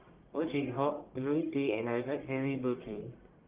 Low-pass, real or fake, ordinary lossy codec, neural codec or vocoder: 3.6 kHz; fake; Opus, 32 kbps; codec, 44.1 kHz, 1.7 kbps, Pupu-Codec